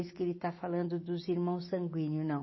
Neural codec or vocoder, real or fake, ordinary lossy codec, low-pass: none; real; MP3, 24 kbps; 7.2 kHz